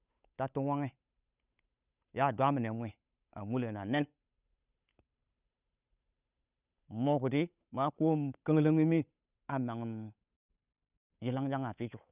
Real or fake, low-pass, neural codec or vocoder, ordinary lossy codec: fake; 3.6 kHz; codec, 16 kHz, 8 kbps, FunCodec, trained on Chinese and English, 25 frames a second; none